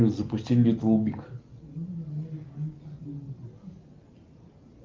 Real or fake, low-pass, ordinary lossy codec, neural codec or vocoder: real; 7.2 kHz; Opus, 32 kbps; none